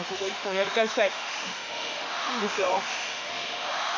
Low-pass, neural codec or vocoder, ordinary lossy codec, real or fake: 7.2 kHz; autoencoder, 48 kHz, 32 numbers a frame, DAC-VAE, trained on Japanese speech; none; fake